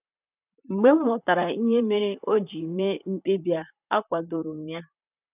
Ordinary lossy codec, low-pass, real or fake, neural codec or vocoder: none; 3.6 kHz; fake; vocoder, 44.1 kHz, 128 mel bands, Pupu-Vocoder